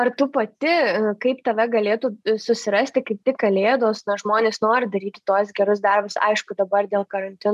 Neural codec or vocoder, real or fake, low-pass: none; real; 14.4 kHz